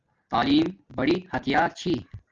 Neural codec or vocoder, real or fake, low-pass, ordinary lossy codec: none; real; 7.2 kHz; Opus, 24 kbps